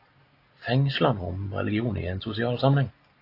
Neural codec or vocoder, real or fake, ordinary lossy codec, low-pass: none; real; AAC, 32 kbps; 5.4 kHz